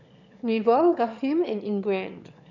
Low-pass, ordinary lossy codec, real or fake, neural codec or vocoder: 7.2 kHz; none; fake; autoencoder, 22.05 kHz, a latent of 192 numbers a frame, VITS, trained on one speaker